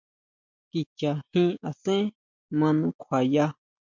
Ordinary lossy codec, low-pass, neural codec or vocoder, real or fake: MP3, 64 kbps; 7.2 kHz; none; real